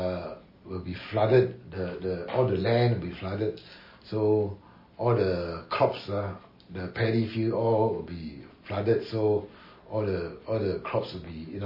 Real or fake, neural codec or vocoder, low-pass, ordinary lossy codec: real; none; 5.4 kHz; MP3, 24 kbps